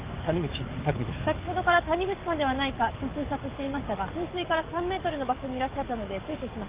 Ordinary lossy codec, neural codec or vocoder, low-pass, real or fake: Opus, 32 kbps; codec, 44.1 kHz, 7.8 kbps, DAC; 3.6 kHz; fake